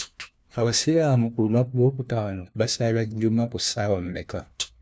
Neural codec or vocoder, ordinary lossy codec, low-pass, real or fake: codec, 16 kHz, 1 kbps, FunCodec, trained on LibriTTS, 50 frames a second; none; none; fake